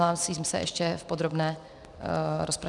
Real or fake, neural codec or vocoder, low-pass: real; none; 10.8 kHz